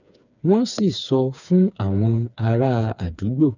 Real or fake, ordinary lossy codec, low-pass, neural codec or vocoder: fake; none; 7.2 kHz; codec, 16 kHz, 4 kbps, FreqCodec, smaller model